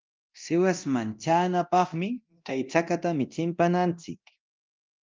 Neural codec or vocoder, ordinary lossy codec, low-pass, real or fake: codec, 24 kHz, 0.9 kbps, DualCodec; Opus, 24 kbps; 7.2 kHz; fake